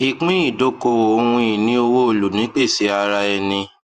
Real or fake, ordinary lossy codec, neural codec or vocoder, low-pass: fake; Opus, 32 kbps; vocoder, 48 kHz, 128 mel bands, Vocos; 14.4 kHz